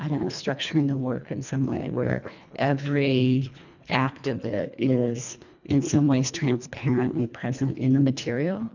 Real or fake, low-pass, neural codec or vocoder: fake; 7.2 kHz; codec, 24 kHz, 1.5 kbps, HILCodec